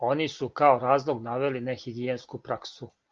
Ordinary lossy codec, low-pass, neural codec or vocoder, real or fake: Opus, 24 kbps; 7.2 kHz; none; real